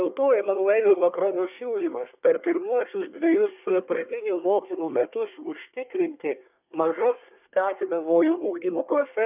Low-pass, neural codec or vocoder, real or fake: 3.6 kHz; codec, 24 kHz, 1 kbps, SNAC; fake